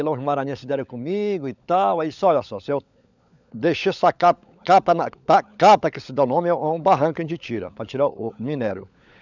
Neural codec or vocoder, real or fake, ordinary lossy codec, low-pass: codec, 16 kHz, 16 kbps, FunCodec, trained on LibriTTS, 50 frames a second; fake; none; 7.2 kHz